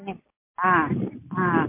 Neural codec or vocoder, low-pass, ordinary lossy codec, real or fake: none; 3.6 kHz; MP3, 24 kbps; real